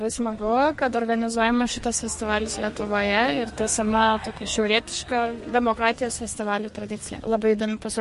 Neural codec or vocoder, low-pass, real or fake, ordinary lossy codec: codec, 32 kHz, 1.9 kbps, SNAC; 14.4 kHz; fake; MP3, 48 kbps